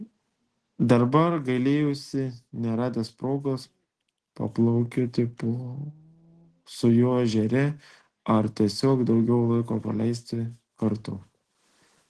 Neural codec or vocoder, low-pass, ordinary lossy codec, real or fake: none; 10.8 kHz; Opus, 16 kbps; real